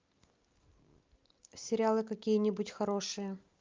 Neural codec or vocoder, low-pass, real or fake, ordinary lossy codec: none; 7.2 kHz; real; Opus, 24 kbps